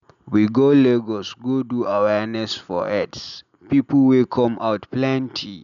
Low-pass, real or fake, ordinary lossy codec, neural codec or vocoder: 7.2 kHz; real; none; none